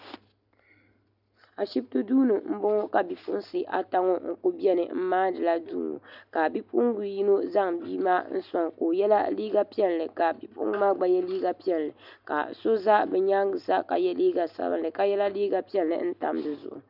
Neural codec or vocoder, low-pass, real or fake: none; 5.4 kHz; real